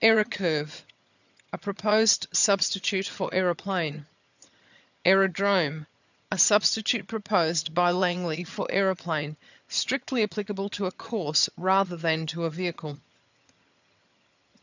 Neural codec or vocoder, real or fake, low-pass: vocoder, 22.05 kHz, 80 mel bands, HiFi-GAN; fake; 7.2 kHz